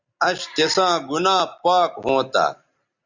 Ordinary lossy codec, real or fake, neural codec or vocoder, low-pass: Opus, 64 kbps; real; none; 7.2 kHz